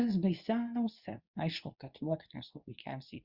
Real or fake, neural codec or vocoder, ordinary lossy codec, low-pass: fake; codec, 24 kHz, 0.9 kbps, WavTokenizer, medium speech release version 2; Opus, 64 kbps; 5.4 kHz